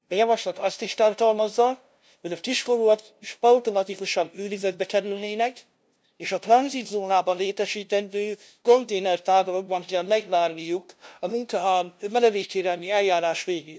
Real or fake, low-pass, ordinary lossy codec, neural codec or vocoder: fake; none; none; codec, 16 kHz, 0.5 kbps, FunCodec, trained on LibriTTS, 25 frames a second